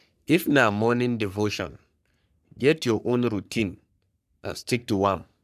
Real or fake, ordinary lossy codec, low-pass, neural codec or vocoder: fake; none; 14.4 kHz; codec, 44.1 kHz, 3.4 kbps, Pupu-Codec